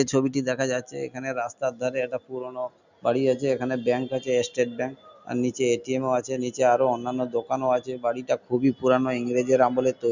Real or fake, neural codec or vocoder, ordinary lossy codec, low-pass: real; none; none; 7.2 kHz